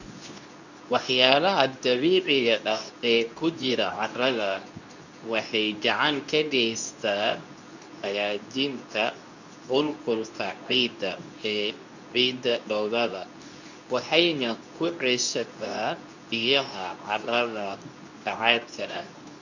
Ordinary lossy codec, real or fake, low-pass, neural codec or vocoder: none; fake; 7.2 kHz; codec, 24 kHz, 0.9 kbps, WavTokenizer, medium speech release version 2